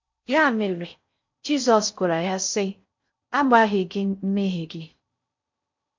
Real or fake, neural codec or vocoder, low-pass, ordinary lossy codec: fake; codec, 16 kHz in and 24 kHz out, 0.6 kbps, FocalCodec, streaming, 4096 codes; 7.2 kHz; MP3, 48 kbps